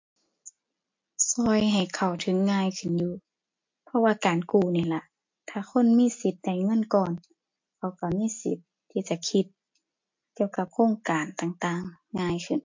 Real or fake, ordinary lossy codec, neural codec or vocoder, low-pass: real; MP3, 48 kbps; none; 7.2 kHz